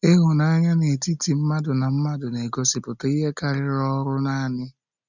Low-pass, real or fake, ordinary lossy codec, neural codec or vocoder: 7.2 kHz; real; none; none